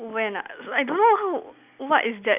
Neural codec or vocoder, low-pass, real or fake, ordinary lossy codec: none; 3.6 kHz; real; none